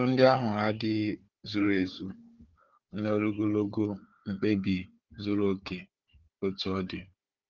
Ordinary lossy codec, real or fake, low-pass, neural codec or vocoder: Opus, 24 kbps; fake; 7.2 kHz; codec, 16 kHz, 4 kbps, FunCodec, trained on Chinese and English, 50 frames a second